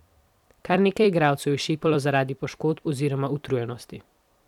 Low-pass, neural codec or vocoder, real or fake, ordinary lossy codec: 19.8 kHz; vocoder, 44.1 kHz, 128 mel bands every 256 samples, BigVGAN v2; fake; none